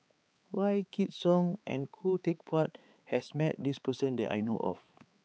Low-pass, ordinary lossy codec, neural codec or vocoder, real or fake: none; none; codec, 16 kHz, 4 kbps, X-Codec, HuBERT features, trained on balanced general audio; fake